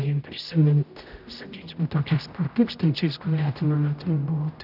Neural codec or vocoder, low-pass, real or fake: codec, 16 kHz, 0.5 kbps, X-Codec, HuBERT features, trained on general audio; 5.4 kHz; fake